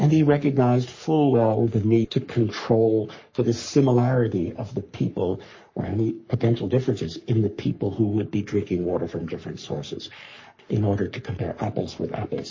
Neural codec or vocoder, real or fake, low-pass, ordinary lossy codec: codec, 44.1 kHz, 3.4 kbps, Pupu-Codec; fake; 7.2 kHz; MP3, 32 kbps